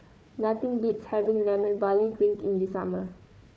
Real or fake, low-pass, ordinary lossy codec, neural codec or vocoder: fake; none; none; codec, 16 kHz, 4 kbps, FunCodec, trained on Chinese and English, 50 frames a second